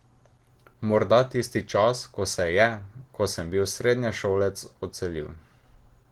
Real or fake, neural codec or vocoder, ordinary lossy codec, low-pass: real; none; Opus, 16 kbps; 19.8 kHz